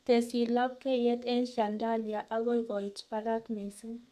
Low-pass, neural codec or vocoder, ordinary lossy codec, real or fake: 14.4 kHz; codec, 32 kHz, 1.9 kbps, SNAC; none; fake